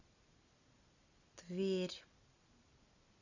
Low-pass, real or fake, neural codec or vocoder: 7.2 kHz; real; none